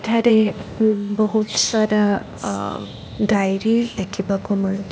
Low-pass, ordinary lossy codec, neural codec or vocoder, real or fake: none; none; codec, 16 kHz, 0.8 kbps, ZipCodec; fake